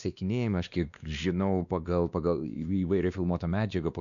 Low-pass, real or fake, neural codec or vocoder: 7.2 kHz; fake; codec, 16 kHz, 2 kbps, X-Codec, WavLM features, trained on Multilingual LibriSpeech